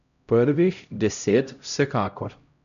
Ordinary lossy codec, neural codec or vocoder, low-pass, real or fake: none; codec, 16 kHz, 0.5 kbps, X-Codec, HuBERT features, trained on LibriSpeech; 7.2 kHz; fake